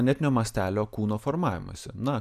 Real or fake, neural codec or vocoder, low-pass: real; none; 14.4 kHz